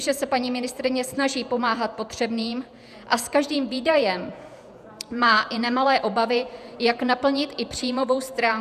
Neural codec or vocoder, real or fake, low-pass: vocoder, 48 kHz, 128 mel bands, Vocos; fake; 14.4 kHz